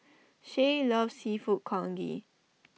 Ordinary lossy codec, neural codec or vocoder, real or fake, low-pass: none; none; real; none